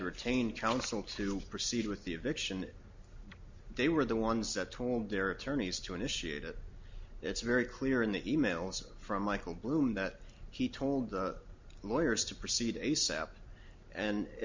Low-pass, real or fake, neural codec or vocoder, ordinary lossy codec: 7.2 kHz; real; none; MP3, 64 kbps